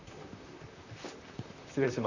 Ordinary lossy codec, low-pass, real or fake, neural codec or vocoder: none; 7.2 kHz; fake; vocoder, 44.1 kHz, 128 mel bands, Pupu-Vocoder